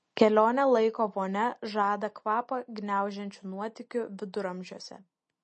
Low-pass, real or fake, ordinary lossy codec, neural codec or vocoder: 10.8 kHz; real; MP3, 32 kbps; none